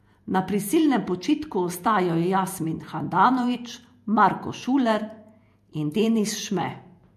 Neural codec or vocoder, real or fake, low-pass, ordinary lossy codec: vocoder, 48 kHz, 128 mel bands, Vocos; fake; 14.4 kHz; MP3, 64 kbps